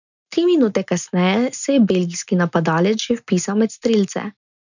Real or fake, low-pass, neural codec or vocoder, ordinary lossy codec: real; 7.2 kHz; none; none